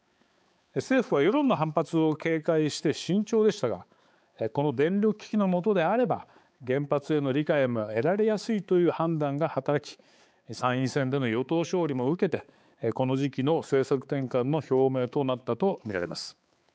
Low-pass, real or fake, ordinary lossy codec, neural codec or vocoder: none; fake; none; codec, 16 kHz, 4 kbps, X-Codec, HuBERT features, trained on balanced general audio